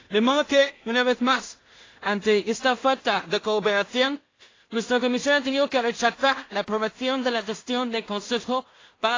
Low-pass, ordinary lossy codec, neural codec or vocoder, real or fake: 7.2 kHz; AAC, 32 kbps; codec, 16 kHz in and 24 kHz out, 0.4 kbps, LongCat-Audio-Codec, two codebook decoder; fake